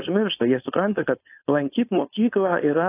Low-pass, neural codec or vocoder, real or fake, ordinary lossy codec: 3.6 kHz; codec, 16 kHz, 4.8 kbps, FACodec; fake; AAC, 24 kbps